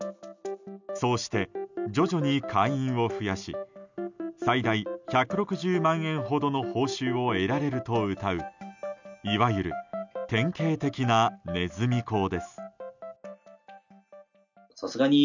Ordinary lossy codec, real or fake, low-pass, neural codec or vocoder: none; real; 7.2 kHz; none